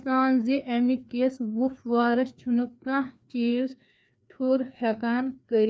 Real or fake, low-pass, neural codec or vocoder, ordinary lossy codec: fake; none; codec, 16 kHz, 2 kbps, FreqCodec, larger model; none